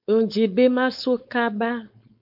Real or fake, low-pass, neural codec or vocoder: fake; 5.4 kHz; codec, 16 kHz, 4.8 kbps, FACodec